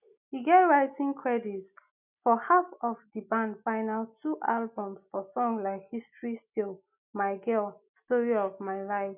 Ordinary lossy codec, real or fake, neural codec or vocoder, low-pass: none; real; none; 3.6 kHz